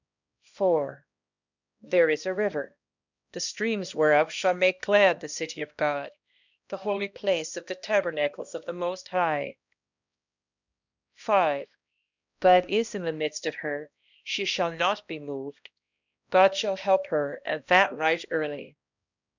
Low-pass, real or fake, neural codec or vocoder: 7.2 kHz; fake; codec, 16 kHz, 1 kbps, X-Codec, HuBERT features, trained on balanced general audio